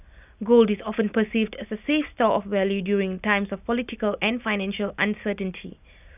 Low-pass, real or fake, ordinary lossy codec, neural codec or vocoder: 3.6 kHz; real; none; none